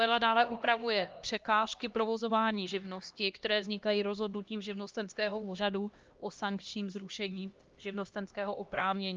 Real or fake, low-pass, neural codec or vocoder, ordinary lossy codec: fake; 7.2 kHz; codec, 16 kHz, 1 kbps, X-Codec, HuBERT features, trained on LibriSpeech; Opus, 32 kbps